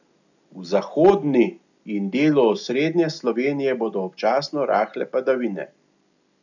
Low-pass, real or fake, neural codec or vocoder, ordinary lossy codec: 7.2 kHz; real; none; none